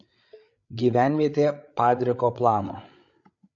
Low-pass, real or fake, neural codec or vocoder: 7.2 kHz; fake; codec, 16 kHz, 8 kbps, FreqCodec, larger model